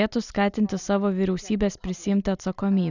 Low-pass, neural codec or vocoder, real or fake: 7.2 kHz; none; real